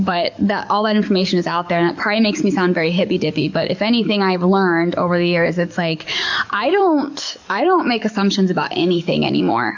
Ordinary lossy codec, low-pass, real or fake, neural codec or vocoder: MP3, 64 kbps; 7.2 kHz; fake; codec, 44.1 kHz, 7.8 kbps, DAC